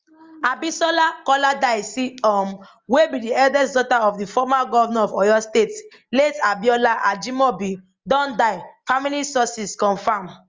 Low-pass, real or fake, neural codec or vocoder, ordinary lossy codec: 7.2 kHz; real; none; Opus, 32 kbps